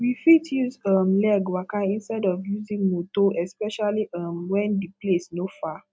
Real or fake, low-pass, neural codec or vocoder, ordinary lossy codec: real; none; none; none